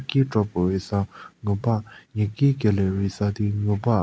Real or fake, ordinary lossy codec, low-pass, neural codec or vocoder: real; none; none; none